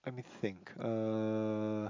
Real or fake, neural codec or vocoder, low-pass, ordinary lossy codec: real; none; 7.2 kHz; MP3, 48 kbps